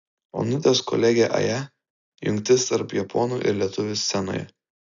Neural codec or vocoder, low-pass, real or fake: none; 7.2 kHz; real